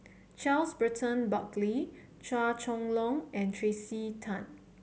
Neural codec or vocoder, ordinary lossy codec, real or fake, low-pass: none; none; real; none